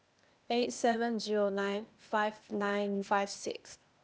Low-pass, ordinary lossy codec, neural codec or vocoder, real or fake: none; none; codec, 16 kHz, 0.8 kbps, ZipCodec; fake